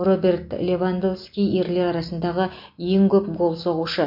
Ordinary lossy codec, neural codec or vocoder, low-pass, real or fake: MP3, 32 kbps; none; 5.4 kHz; real